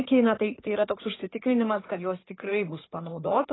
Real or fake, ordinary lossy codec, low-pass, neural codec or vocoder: fake; AAC, 16 kbps; 7.2 kHz; codec, 16 kHz in and 24 kHz out, 2.2 kbps, FireRedTTS-2 codec